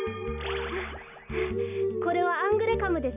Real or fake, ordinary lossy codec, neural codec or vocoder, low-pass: real; none; none; 3.6 kHz